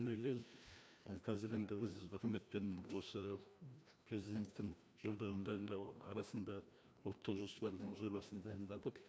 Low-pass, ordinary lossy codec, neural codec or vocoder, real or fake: none; none; codec, 16 kHz, 1 kbps, FreqCodec, larger model; fake